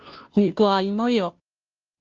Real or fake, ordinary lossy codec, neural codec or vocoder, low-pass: fake; Opus, 24 kbps; codec, 16 kHz, 0.5 kbps, FunCodec, trained on Chinese and English, 25 frames a second; 7.2 kHz